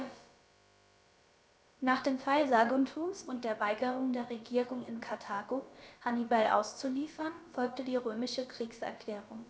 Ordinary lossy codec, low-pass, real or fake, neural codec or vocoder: none; none; fake; codec, 16 kHz, about 1 kbps, DyCAST, with the encoder's durations